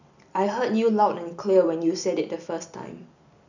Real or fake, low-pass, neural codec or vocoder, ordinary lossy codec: real; 7.2 kHz; none; none